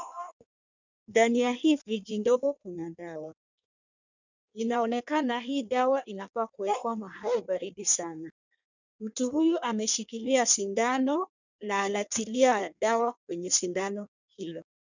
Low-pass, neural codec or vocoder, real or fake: 7.2 kHz; codec, 16 kHz in and 24 kHz out, 1.1 kbps, FireRedTTS-2 codec; fake